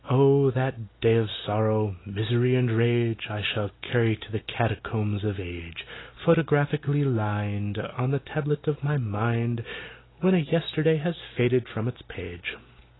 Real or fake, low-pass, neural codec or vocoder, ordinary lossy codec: real; 7.2 kHz; none; AAC, 16 kbps